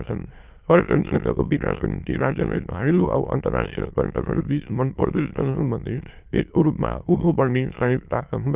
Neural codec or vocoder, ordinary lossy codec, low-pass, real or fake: autoencoder, 22.05 kHz, a latent of 192 numbers a frame, VITS, trained on many speakers; Opus, 24 kbps; 3.6 kHz; fake